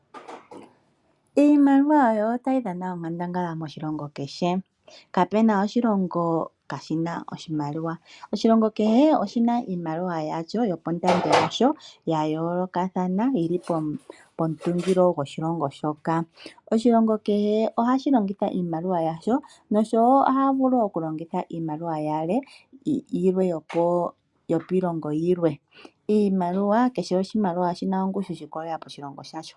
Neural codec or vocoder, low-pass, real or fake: none; 10.8 kHz; real